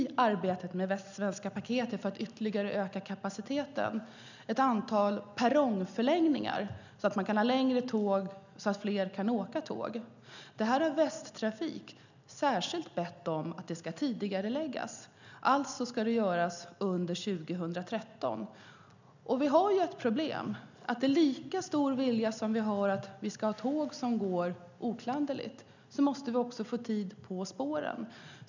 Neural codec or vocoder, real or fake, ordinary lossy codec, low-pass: none; real; none; 7.2 kHz